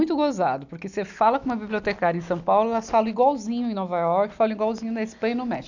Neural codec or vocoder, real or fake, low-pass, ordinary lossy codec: none; real; 7.2 kHz; none